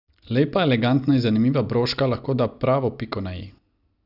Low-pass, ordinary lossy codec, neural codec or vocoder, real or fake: 5.4 kHz; none; none; real